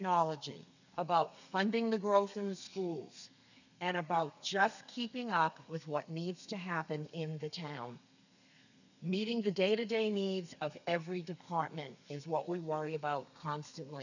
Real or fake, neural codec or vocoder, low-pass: fake; codec, 32 kHz, 1.9 kbps, SNAC; 7.2 kHz